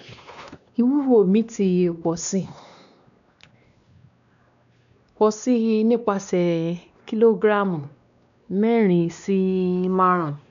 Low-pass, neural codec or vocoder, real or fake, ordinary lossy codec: 7.2 kHz; codec, 16 kHz, 2 kbps, X-Codec, WavLM features, trained on Multilingual LibriSpeech; fake; none